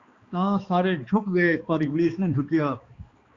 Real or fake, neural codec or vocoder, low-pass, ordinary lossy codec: fake; codec, 16 kHz, 2 kbps, X-Codec, HuBERT features, trained on balanced general audio; 7.2 kHz; Opus, 64 kbps